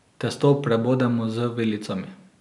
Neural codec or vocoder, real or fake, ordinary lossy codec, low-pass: none; real; none; 10.8 kHz